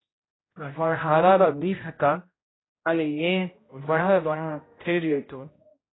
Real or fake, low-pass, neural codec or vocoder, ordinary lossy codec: fake; 7.2 kHz; codec, 16 kHz, 0.5 kbps, X-Codec, HuBERT features, trained on general audio; AAC, 16 kbps